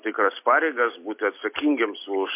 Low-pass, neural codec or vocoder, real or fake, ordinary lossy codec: 3.6 kHz; none; real; MP3, 24 kbps